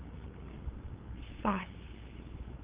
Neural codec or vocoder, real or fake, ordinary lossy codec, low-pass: codec, 16 kHz, 8 kbps, FunCodec, trained on LibriTTS, 25 frames a second; fake; Opus, 24 kbps; 3.6 kHz